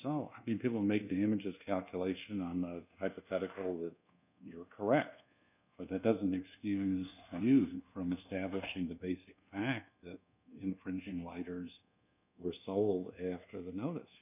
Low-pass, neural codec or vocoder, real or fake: 3.6 kHz; codec, 24 kHz, 1.2 kbps, DualCodec; fake